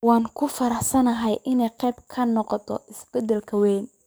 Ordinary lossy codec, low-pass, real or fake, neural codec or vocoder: none; none; fake; codec, 44.1 kHz, 7.8 kbps, DAC